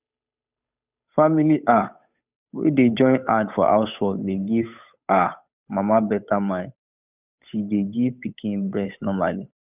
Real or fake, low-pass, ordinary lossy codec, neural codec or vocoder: fake; 3.6 kHz; none; codec, 16 kHz, 8 kbps, FunCodec, trained on Chinese and English, 25 frames a second